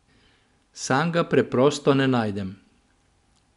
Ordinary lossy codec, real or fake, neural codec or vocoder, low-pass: none; real; none; 10.8 kHz